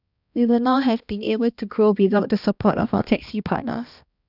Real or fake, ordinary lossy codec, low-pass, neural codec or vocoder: fake; none; 5.4 kHz; codec, 16 kHz, 1 kbps, X-Codec, HuBERT features, trained on balanced general audio